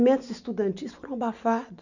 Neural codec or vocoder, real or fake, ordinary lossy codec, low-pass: none; real; none; 7.2 kHz